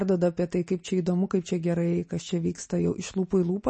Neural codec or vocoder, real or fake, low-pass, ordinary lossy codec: none; real; 10.8 kHz; MP3, 32 kbps